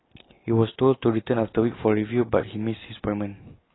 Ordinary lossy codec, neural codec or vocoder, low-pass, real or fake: AAC, 16 kbps; none; 7.2 kHz; real